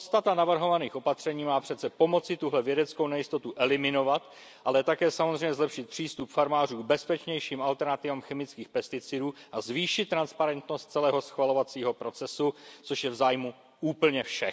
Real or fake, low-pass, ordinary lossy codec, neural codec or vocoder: real; none; none; none